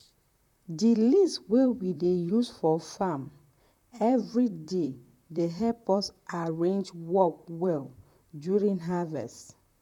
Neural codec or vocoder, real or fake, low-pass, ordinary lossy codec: vocoder, 44.1 kHz, 128 mel bands, Pupu-Vocoder; fake; 19.8 kHz; none